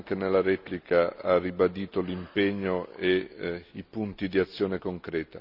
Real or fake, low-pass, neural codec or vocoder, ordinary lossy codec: real; 5.4 kHz; none; Opus, 64 kbps